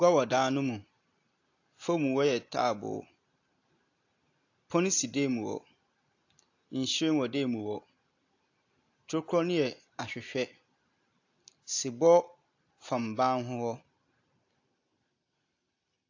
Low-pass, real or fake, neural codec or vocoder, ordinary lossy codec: 7.2 kHz; real; none; AAC, 48 kbps